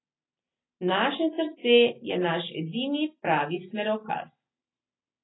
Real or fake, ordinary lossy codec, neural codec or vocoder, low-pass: real; AAC, 16 kbps; none; 7.2 kHz